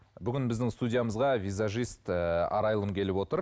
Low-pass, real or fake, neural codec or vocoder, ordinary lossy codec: none; real; none; none